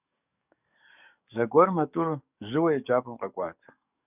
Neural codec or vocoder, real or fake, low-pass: codec, 44.1 kHz, 7.8 kbps, DAC; fake; 3.6 kHz